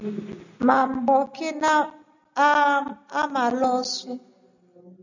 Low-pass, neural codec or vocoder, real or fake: 7.2 kHz; none; real